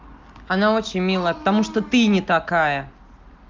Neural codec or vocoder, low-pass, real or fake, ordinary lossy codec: none; 7.2 kHz; real; Opus, 32 kbps